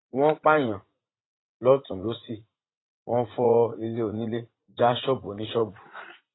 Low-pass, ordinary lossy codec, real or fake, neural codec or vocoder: 7.2 kHz; AAC, 16 kbps; fake; vocoder, 44.1 kHz, 80 mel bands, Vocos